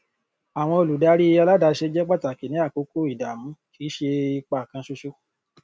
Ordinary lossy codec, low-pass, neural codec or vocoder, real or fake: none; none; none; real